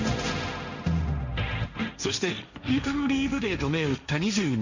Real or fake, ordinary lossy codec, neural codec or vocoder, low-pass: fake; none; codec, 16 kHz, 1.1 kbps, Voila-Tokenizer; 7.2 kHz